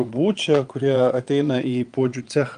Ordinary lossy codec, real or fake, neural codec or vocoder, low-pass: Opus, 32 kbps; fake; vocoder, 22.05 kHz, 80 mel bands, Vocos; 9.9 kHz